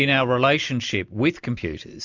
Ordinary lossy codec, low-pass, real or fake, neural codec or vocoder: MP3, 64 kbps; 7.2 kHz; real; none